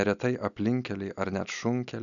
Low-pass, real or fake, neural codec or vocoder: 7.2 kHz; real; none